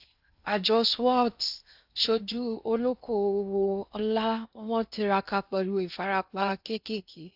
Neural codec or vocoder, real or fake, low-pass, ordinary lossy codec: codec, 16 kHz in and 24 kHz out, 0.6 kbps, FocalCodec, streaming, 2048 codes; fake; 5.4 kHz; AAC, 48 kbps